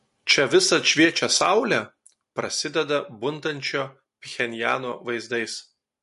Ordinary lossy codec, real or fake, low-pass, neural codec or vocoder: MP3, 48 kbps; real; 14.4 kHz; none